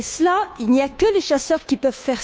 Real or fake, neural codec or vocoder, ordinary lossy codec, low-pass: fake; codec, 16 kHz, 2 kbps, FunCodec, trained on Chinese and English, 25 frames a second; none; none